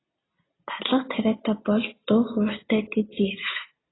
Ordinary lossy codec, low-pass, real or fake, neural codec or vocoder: AAC, 16 kbps; 7.2 kHz; real; none